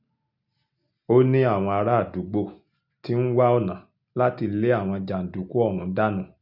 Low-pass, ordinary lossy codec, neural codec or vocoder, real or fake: 5.4 kHz; none; none; real